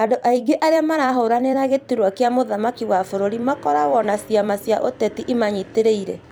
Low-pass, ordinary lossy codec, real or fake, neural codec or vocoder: none; none; fake; vocoder, 44.1 kHz, 128 mel bands every 256 samples, BigVGAN v2